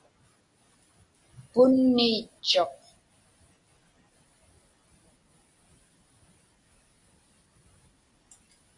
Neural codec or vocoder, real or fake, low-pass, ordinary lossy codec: none; real; 10.8 kHz; AAC, 64 kbps